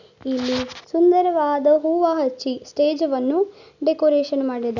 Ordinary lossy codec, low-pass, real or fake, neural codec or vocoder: none; 7.2 kHz; real; none